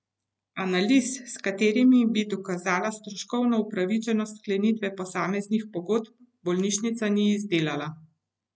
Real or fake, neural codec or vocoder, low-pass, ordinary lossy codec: real; none; none; none